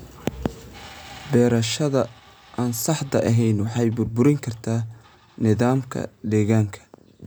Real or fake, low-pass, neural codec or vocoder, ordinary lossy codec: real; none; none; none